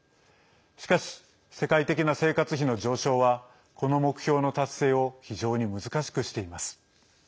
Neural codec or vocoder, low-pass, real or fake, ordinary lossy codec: none; none; real; none